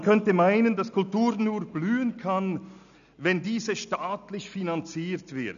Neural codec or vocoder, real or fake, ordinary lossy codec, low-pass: none; real; none; 7.2 kHz